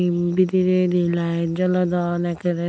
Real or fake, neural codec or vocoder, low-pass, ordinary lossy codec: real; none; none; none